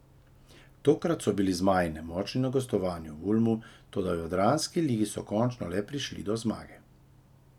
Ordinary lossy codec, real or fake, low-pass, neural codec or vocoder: none; real; 19.8 kHz; none